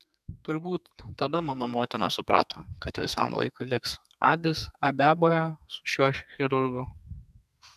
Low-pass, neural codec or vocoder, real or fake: 14.4 kHz; codec, 32 kHz, 1.9 kbps, SNAC; fake